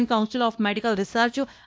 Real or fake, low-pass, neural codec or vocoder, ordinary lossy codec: fake; none; codec, 16 kHz, 1 kbps, X-Codec, WavLM features, trained on Multilingual LibriSpeech; none